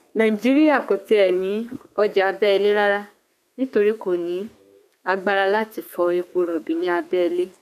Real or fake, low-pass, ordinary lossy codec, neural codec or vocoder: fake; 14.4 kHz; none; codec, 32 kHz, 1.9 kbps, SNAC